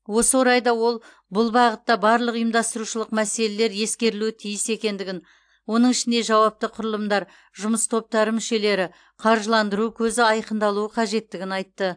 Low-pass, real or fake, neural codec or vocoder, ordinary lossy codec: 9.9 kHz; real; none; AAC, 64 kbps